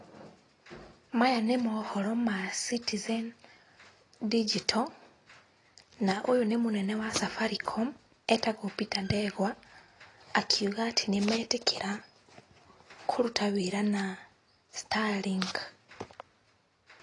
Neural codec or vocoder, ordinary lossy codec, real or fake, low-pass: none; AAC, 32 kbps; real; 10.8 kHz